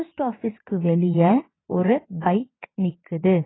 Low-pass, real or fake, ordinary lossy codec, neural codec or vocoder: 7.2 kHz; fake; AAC, 16 kbps; vocoder, 22.05 kHz, 80 mel bands, WaveNeXt